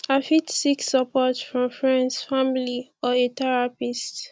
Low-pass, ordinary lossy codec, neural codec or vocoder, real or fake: none; none; none; real